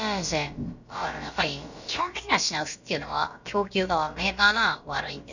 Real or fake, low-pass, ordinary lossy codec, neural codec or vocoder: fake; 7.2 kHz; none; codec, 16 kHz, about 1 kbps, DyCAST, with the encoder's durations